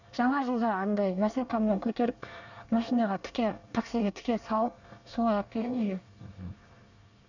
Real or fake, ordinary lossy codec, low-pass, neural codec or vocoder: fake; none; 7.2 kHz; codec, 24 kHz, 1 kbps, SNAC